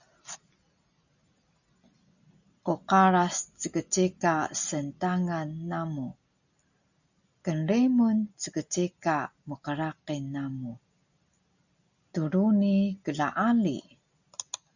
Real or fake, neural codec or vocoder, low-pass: real; none; 7.2 kHz